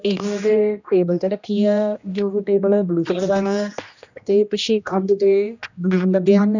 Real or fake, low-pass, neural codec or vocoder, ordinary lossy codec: fake; 7.2 kHz; codec, 16 kHz, 1 kbps, X-Codec, HuBERT features, trained on general audio; none